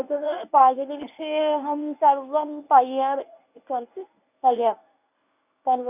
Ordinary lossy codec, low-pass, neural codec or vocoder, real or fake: none; 3.6 kHz; codec, 24 kHz, 0.9 kbps, WavTokenizer, medium speech release version 1; fake